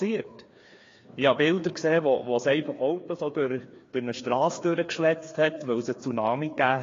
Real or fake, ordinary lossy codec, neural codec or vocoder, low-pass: fake; AAC, 32 kbps; codec, 16 kHz, 2 kbps, FreqCodec, larger model; 7.2 kHz